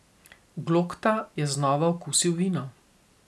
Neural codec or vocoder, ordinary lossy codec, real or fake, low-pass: none; none; real; none